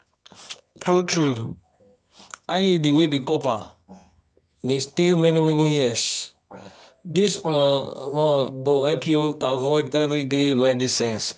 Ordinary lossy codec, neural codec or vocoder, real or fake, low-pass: none; codec, 24 kHz, 0.9 kbps, WavTokenizer, medium music audio release; fake; none